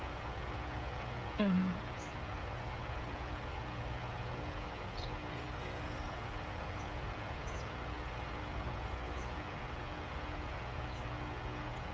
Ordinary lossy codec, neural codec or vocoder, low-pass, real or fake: none; codec, 16 kHz, 16 kbps, FreqCodec, smaller model; none; fake